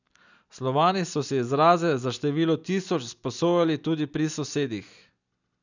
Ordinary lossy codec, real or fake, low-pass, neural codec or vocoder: none; real; 7.2 kHz; none